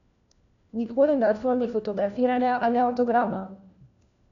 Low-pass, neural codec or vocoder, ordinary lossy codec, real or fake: 7.2 kHz; codec, 16 kHz, 1 kbps, FunCodec, trained on LibriTTS, 50 frames a second; none; fake